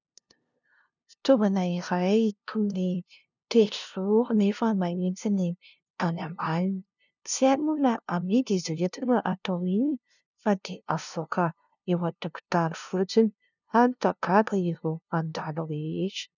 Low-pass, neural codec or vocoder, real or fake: 7.2 kHz; codec, 16 kHz, 0.5 kbps, FunCodec, trained on LibriTTS, 25 frames a second; fake